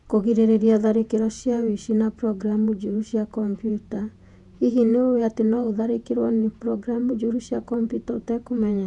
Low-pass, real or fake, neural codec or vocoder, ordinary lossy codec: 10.8 kHz; fake; vocoder, 48 kHz, 128 mel bands, Vocos; none